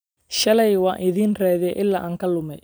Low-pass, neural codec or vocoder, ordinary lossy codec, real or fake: none; none; none; real